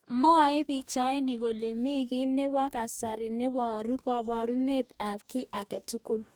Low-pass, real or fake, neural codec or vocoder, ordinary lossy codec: none; fake; codec, 44.1 kHz, 2.6 kbps, DAC; none